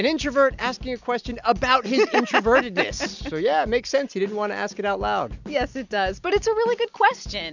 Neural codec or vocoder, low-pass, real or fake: none; 7.2 kHz; real